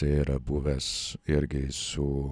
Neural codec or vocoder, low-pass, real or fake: none; 9.9 kHz; real